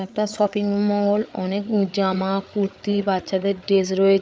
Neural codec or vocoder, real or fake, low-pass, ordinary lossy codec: codec, 16 kHz, 8 kbps, FreqCodec, larger model; fake; none; none